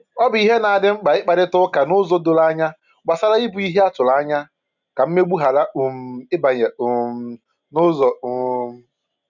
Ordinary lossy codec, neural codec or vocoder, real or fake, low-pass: none; none; real; 7.2 kHz